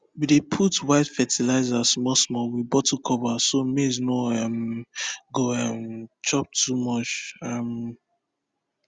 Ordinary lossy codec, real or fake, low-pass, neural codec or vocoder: Opus, 64 kbps; real; 9.9 kHz; none